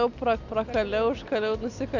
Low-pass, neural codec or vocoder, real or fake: 7.2 kHz; none; real